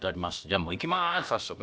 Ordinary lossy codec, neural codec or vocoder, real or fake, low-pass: none; codec, 16 kHz, about 1 kbps, DyCAST, with the encoder's durations; fake; none